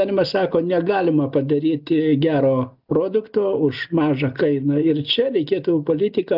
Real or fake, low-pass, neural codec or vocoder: real; 5.4 kHz; none